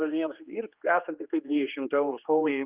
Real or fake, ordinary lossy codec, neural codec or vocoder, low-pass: fake; Opus, 24 kbps; codec, 16 kHz, 2 kbps, X-Codec, HuBERT features, trained on general audio; 3.6 kHz